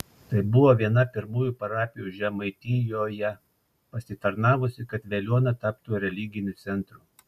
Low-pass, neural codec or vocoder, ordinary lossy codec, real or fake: 14.4 kHz; none; MP3, 96 kbps; real